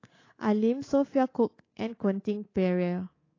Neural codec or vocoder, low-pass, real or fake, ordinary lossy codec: codec, 24 kHz, 3.1 kbps, DualCodec; 7.2 kHz; fake; AAC, 32 kbps